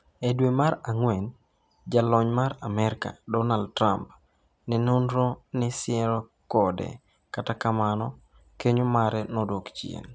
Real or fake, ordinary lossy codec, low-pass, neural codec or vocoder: real; none; none; none